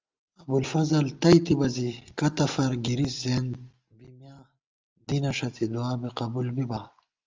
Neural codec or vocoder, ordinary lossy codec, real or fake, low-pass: none; Opus, 32 kbps; real; 7.2 kHz